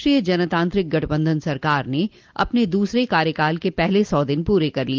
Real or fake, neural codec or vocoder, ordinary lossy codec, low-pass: real; none; Opus, 32 kbps; 7.2 kHz